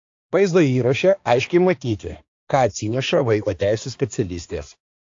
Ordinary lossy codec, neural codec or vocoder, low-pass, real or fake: AAC, 48 kbps; codec, 16 kHz, 2 kbps, X-Codec, HuBERT features, trained on balanced general audio; 7.2 kHz; fake